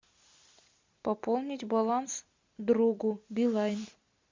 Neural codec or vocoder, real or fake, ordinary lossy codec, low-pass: none; real; MP3, 64 kbps; 7.2 kHz